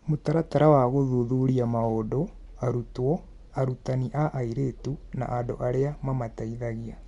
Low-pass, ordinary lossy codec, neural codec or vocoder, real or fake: 10.8 kHz; MP3, 64 kbps; none; real